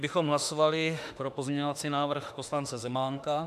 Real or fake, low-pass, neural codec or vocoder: fake; 14.4 kHz; autoencoder, 48 kHz, 32 numbers a frame, DAC-VAE, trained on Japanese speech